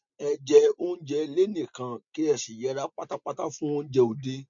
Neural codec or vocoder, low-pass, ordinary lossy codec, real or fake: none; 7.2 kHz; MP3, 48 kbps; real